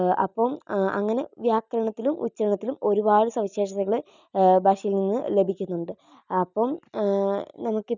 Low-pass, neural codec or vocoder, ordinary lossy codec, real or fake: 7.2 kHz; none; none; real